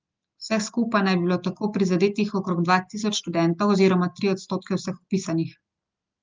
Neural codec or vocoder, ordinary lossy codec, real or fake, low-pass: none; Opus, 32 kbps; real; 7.2 kHz